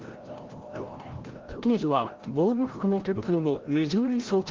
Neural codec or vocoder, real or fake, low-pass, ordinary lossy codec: codec, 16 kHz, 0.5 kbps, FreqCodec, larger model; fake; 7.2 kHz; Opus, 16 kbps